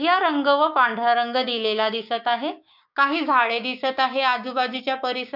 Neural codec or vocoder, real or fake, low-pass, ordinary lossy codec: codec, 16 kHz, 6 kbps, DAC; fake; 5.4 kHz; none